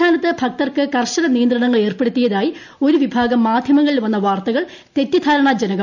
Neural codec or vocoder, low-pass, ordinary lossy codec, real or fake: none; 7.2 kHz; none; real